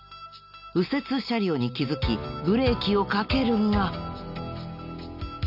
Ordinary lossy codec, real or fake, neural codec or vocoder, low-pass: none; real; none; 5.4 kHz